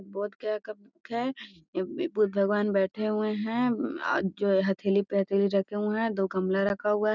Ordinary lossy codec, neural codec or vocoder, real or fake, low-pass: none; none; real; 7.2 kHz